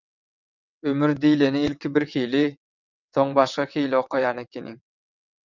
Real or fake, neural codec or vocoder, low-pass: fake; vocoder, 22.05 kHz, 80 mel bands, WaveNeXt; 7.2 kHz